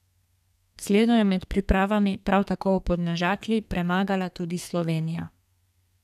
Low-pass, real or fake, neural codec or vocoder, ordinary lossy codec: 14.4 kHz; fake; codec, 32 kHz, 1.9 kbps, SNAC; none